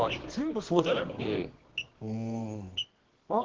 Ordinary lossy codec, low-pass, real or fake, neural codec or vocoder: Opus, 16 kbps; 7.2 kHz; fake; codec, 24 kHz, 0.9 kbps, WavTokenizer, medium music audio release